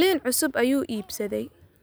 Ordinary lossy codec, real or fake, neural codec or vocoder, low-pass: none; real; none; none